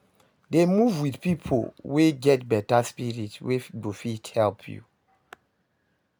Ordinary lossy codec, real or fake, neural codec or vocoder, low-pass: none; real; none; none